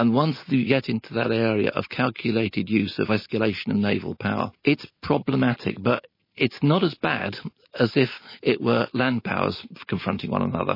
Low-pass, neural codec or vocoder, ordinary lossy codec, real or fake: 5.4 kHz; none; MP3, 24 kbps; real